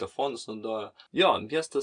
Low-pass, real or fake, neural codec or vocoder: 9.9 kHz; real; none